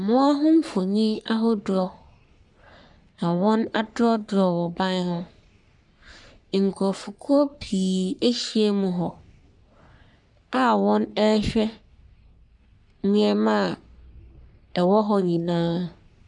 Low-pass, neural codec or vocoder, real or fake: 10.8 kHz; codec, 44.1 kHz, 3.4 kbps, Pupu-Codec; fake